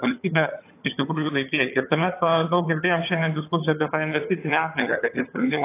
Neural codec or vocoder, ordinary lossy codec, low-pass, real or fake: vocoder, 22.05 kHz, 80 mel bands, HiFi-GAN; AAC, 24 kbps; 3.6 kHz; fake